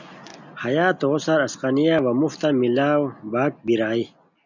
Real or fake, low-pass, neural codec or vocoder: real; 7.2 kHz; none